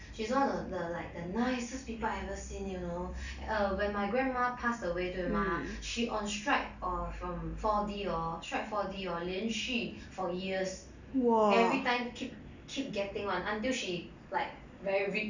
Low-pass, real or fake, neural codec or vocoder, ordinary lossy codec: 7.2 kHz; real; none; none